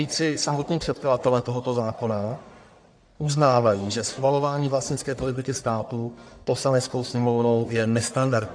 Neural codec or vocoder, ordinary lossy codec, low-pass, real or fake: codec, 44.1 kHz, 1.7 kbps, Pupu-Codec; AAC, 64 kbps; 9.9 kHz; fake